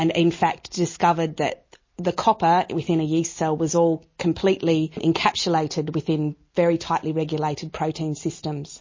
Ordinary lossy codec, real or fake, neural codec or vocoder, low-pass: MP3, 32 kbps; real; none; 7.2 kHz